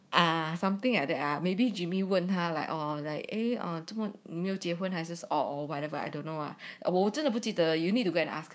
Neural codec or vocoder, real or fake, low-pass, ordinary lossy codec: codec, 16 kHz, 6 kbps, DAC; fake; none; none